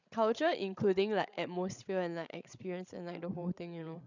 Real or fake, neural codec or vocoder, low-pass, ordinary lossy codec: fake; codec, 16 kHz, 16 kbps, FreqCodec, larger model; 7.2 kHz; none